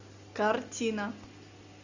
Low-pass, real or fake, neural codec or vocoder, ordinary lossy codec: 7.2 kHz; real; none; Opus, 64 kbps